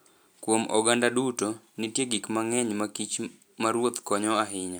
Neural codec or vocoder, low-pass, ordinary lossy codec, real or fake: none; none; none; real